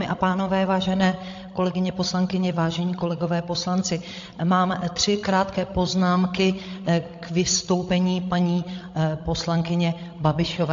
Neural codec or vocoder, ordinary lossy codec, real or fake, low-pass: codec, 16 kHz, 16 kbps, FreqCodec, larger model; AAC, 48 kbps; fake; 7.2 kHz